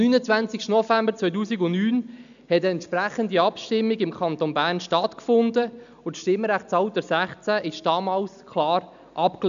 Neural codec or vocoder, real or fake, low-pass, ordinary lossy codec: none; real; 7.2 kHz; none